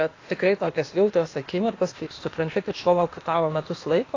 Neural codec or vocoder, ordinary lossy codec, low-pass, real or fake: codec, 16 kHz, 0.8 kbps, ZipCodec; AAC, 32 kbps; 7.2 kHz; fake